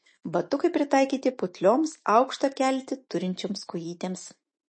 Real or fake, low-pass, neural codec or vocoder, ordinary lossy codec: fake; 9.9 kHz; autoencoder, 48 kHz, 128 numbers a frame, DAC-VAE, trained on Japanese speech; MP3, 32 kbps